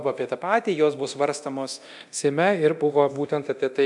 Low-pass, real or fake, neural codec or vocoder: 10.8 kHz; fake; codec, 24 kHz, 0.9 kbps, DualCodec